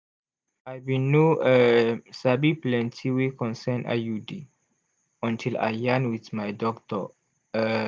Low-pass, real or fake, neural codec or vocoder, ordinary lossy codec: none; real; none; none